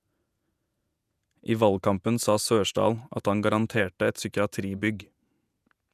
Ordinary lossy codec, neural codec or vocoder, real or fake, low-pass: none; vocoder, 48 kHz, 128 mel bands, Vocos; fake; 14.4 kHz